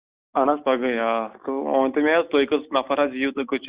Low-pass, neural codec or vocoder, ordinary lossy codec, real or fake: 3.6 kHz; none; Opus, 64 kbps; real